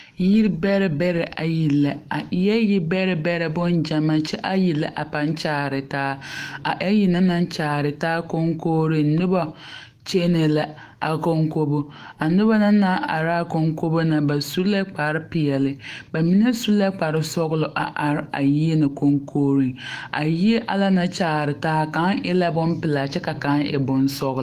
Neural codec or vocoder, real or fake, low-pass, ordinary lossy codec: autoencoder, 48 kHz, 128 numbers a frame, DAC-VAE, trained on Japanese speech; fake; 14.4 kHz; Opus, 24 kbps